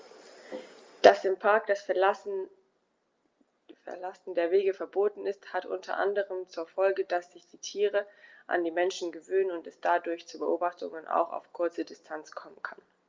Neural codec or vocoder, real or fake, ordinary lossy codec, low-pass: none; real; Opus, 32 kbps; 7.2 kHz